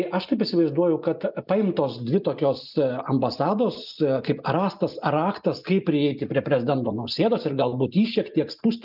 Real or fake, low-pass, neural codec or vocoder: real; 5.4 kHz; none